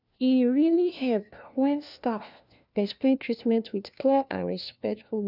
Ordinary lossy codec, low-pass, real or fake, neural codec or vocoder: none; 5.4 kHz; fake; codec, 16 kHz, 1 kbps, FunCodec, trained on LibriTTS, 50 frames a second